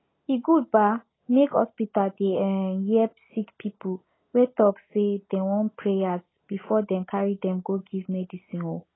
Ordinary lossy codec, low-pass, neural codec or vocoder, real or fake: AAC, 16 kbps; 7.2 kHz; none; real